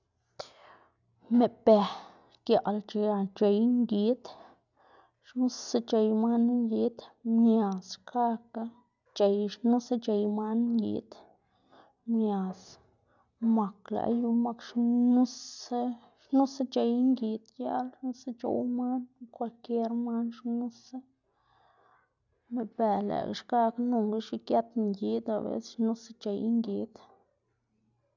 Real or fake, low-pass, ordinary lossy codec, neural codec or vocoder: real; 7.2 kHz; none; none